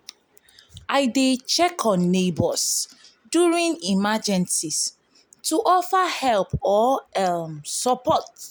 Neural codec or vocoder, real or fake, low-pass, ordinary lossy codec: none; real; none; none